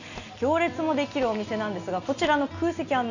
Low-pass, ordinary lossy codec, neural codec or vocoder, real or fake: 7.2 kHz; none; none; real